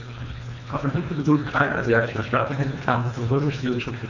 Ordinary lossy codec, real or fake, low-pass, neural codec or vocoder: none; fake; 7.2 kHz; codec, 24 kHz, 1.5 kbps, HILCodec